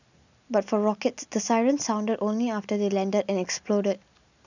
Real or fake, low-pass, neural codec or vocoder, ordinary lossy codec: real; 7.2 kHz; none; none